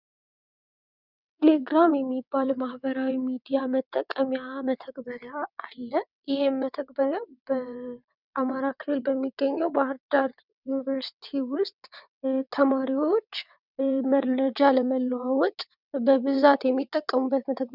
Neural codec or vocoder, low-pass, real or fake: vocoder, 22.05 kHz, 80 mel bands, WaveNeXt; 5.4 kHz; fake